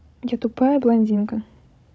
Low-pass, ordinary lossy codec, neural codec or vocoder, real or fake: none; none; codec, 16 kHz, 16 kbps, FunCodec, trained on Chinese and English, 50 frames a second; fake